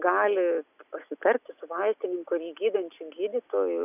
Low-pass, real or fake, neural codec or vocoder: 3.6 kHz; real; none